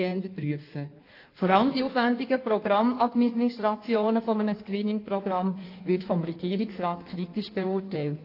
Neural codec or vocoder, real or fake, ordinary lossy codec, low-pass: codec, 16 kHz in and 24 kHz out, 1.1 kbps, FireRedTTS-2 codec; fake; AAC, 32 kbps; 5.4 kHz